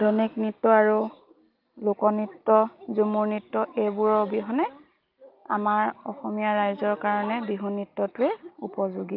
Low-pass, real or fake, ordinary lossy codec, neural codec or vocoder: 5.4 kHz; real; Opus, 24 kbps; none